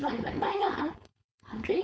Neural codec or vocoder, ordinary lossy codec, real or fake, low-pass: codec, 16 kHz, 4.8 kbps, FACodec; none; fake; none